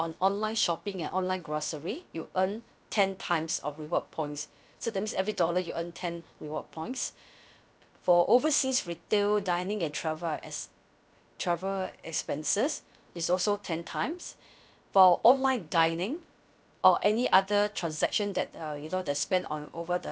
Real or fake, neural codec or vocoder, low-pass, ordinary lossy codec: fake; codec, 16 kHz, about 1 kbps, DyCAST, with the encoder's durations; none; none